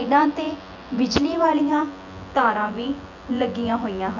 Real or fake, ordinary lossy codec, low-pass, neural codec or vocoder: fake; none; 7.2 kHz; vocoder, 24 kHz, 100 mel bands, Vocos